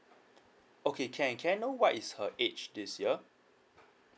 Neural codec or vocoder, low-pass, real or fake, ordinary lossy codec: none; none; real; none